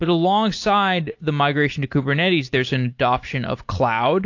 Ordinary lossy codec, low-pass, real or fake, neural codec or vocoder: AAC, 48 kbps; 7.2 kHz; real; none